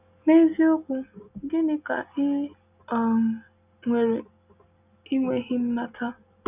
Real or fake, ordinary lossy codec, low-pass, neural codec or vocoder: real; none; 3.6 kHz; none